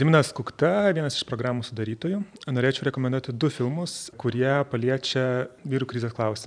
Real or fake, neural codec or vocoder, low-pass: fake; vocoder, 44.1 kHz, 128 mel bands every 512 samples, BigVGAN v2; 9.9 kHz